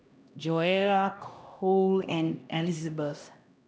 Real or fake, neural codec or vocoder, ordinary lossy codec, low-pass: fake; codec, 16 kHz, 1 kbps, X-Codec, HuBERT features, trained on LibriSpeech; none; none